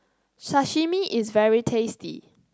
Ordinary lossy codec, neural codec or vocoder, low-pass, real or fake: none; none; none; real